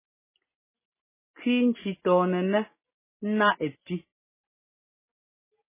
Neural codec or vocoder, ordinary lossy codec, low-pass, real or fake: none; MP3, 16 kbps; 3.6 kHz; real